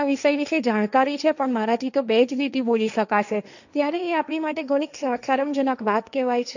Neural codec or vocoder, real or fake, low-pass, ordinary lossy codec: codec, 16 kHz, 1.1 kbps, Voila-Tokenizer; fake; 7.2 kHz; none